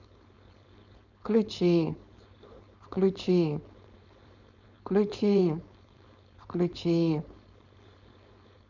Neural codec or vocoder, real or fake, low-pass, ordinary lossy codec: codec, 16 kHz, 4.8 kbps, FACodec; fake; 7.2 kHz; none